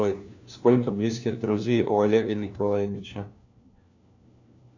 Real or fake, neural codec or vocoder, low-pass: fake; codec, 16 kHz, 1 kbps, FunCodec, trained on LibriTTS, 50 frames a second; 7.2 kHz